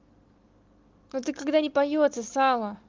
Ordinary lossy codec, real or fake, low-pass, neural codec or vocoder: Opus, 32 kbps; real; 7.2 kHz; none